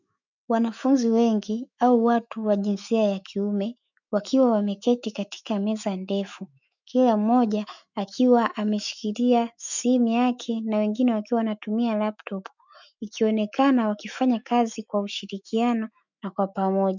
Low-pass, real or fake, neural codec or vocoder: 7.2 kHz; fake; autoencoder, 48 kHz, 128 numbers a frame, DAC-VAE, trained on Japanese speech